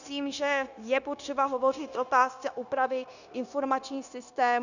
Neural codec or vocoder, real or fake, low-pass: codec, 16 kHz, 0.9 kbps, LongCat-Audio-Codec; fake; 7.2 kHz